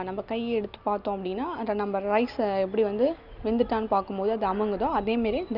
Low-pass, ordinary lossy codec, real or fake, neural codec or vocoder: 5.4 kHz; none; real; none